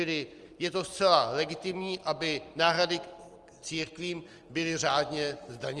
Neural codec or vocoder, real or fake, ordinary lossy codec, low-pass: none; real; Opus, 24 kbps; 10.8 kHz